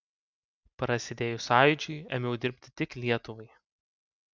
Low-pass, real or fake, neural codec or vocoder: 7.2 kHz; real; none